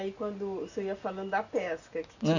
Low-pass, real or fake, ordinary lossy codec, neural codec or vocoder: 7.2 kHz; real; none; none